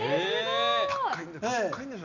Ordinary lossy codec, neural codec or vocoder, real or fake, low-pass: none; none; real; 7.2 kHz